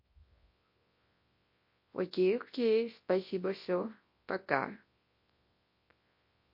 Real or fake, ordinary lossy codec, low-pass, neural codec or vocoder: fake; MP3, 32 kbps; 5.4 kHz; codec, 24 kHz, 0.9 kbps, WavTokenizer, large speech release